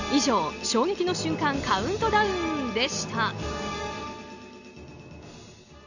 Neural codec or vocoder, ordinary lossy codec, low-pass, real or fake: none; none; 7.2 kHz; real